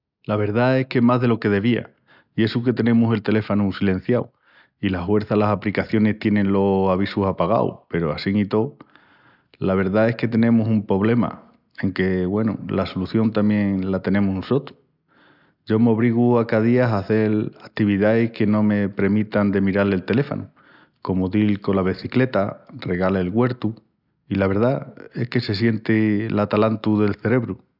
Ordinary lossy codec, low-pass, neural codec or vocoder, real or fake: none; 5.4 kHz; none; real